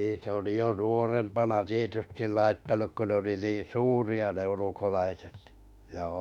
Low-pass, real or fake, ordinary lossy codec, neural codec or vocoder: 19.8 kHz; fake; none; autoencoder, 48 kHz, 32 numbers a frame, DAC-VAE, trained on Japanese speech